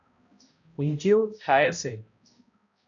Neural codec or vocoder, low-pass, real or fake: codec, 16 kHz, 0.5 kbps, X-Codec, HuBERT features, trained on balanced general audio; 7.2 kHz; fake